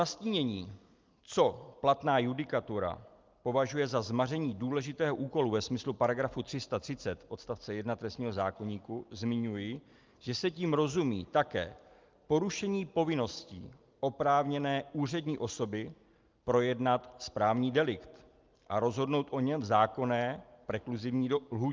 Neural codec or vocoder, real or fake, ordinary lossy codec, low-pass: none; real; Opus, 32 kbps; 7.2 kHz